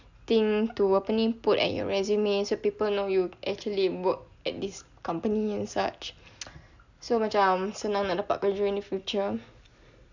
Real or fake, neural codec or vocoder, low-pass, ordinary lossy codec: real; none; 7.2 kHz; none